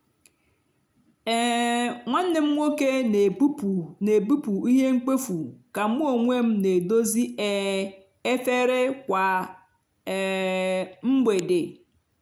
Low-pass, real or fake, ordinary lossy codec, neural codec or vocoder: 19.8 kHz; real; none; none